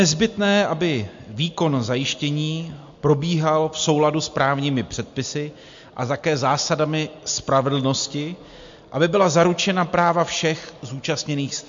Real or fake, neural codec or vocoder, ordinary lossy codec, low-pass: real; none; MP3, 48 kbps; 7.2 kHz